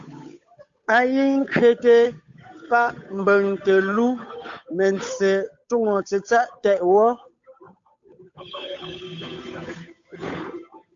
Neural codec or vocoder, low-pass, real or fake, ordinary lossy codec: codec, 16 kHz, 8 kbps, FunCodec, trained on Chinese and English, 25 frames a second; 7.2 kHz; fake; AAC, 64 kbps